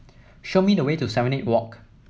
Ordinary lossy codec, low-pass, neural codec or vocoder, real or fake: none; none; none; real